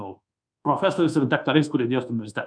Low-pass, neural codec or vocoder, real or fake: 9.9 kHz; codec, 24 kHz, 1.2 kbps, DualCodec; fake